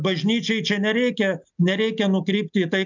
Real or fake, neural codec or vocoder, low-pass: real; none; 7.2 kHz